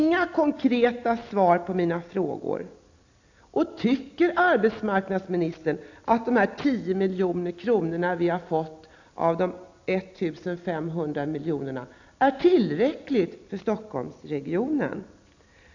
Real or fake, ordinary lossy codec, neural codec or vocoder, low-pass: real; AAC, 48 kbps; none; 7.2 kHz